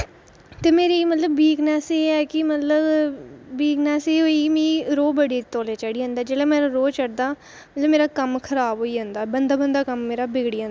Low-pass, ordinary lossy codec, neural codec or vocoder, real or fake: none; none; none; real